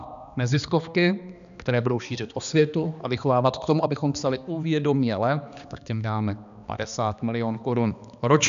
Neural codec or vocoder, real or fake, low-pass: codec, 16 kHz, 2 kbps, X-Codec, HuBERT features, trained on balanced general audio; fake; 7.2 kHz